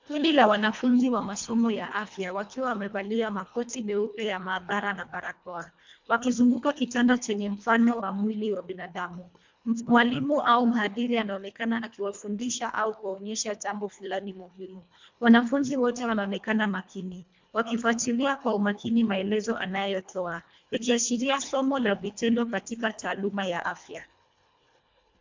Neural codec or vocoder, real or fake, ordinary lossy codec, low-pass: codec, 24 kHz, 1.5 kbps, HILCodec; fake; MP3, 64 kbps; 7.2 kHz